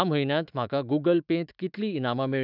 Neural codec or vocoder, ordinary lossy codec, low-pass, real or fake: autoencoder, 48 kHz, 128 numbers a frame, DAC-VAE, trained on Japanese speech; none; 5.4 kHz; fake